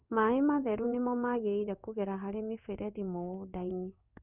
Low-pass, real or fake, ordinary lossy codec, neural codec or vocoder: 3.6 kHz; fake; none; codec, 16 kHz in and 24 kHz out, 1 kbps, XY-Tokenizer